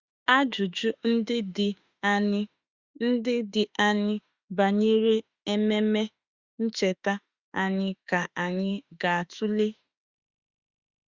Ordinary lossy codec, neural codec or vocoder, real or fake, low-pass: Opus, 64 kbps; autoencoder, 48 kHz, 32 numbers a frame, DAC-VAE, trained on Japanese speech; fake; 7.2 kHz